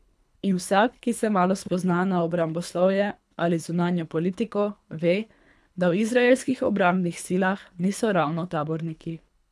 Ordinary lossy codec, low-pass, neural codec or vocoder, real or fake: none; none; codec, 24 kHz, 3 kbps, HILCodec; fake